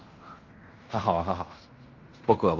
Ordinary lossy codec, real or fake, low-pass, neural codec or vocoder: Opus, 32 kbps; fake; 7.2 kHz; codec, 16 kHz in and 24 kHz out, 0.9 kbps, LongCat-Audio-Codec, fine tuned four codebook decoder